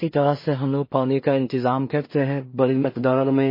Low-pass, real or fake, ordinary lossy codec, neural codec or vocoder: 5.4 kHz; fake; MP3, 24 kbps; codec, 16 kHz in and 24 kHz out, 0.4 kbps, LongCat-Audio-Codec, two codebook decoder